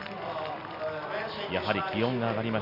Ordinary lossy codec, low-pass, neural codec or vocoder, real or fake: none; 5.4 kHz; none; real